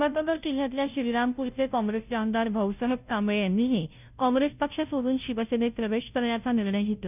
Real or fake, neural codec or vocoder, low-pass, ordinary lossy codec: fake; codec, 16 kHz, 0.5 kbps, FunCodec, trained on Chinese and English, 25 frames a second; 3.6 kHz; none